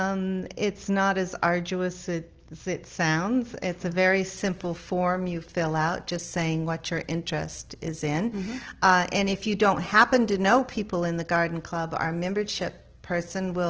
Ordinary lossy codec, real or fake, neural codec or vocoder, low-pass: Opus, 16 kbps; real; none; 7.2 kHz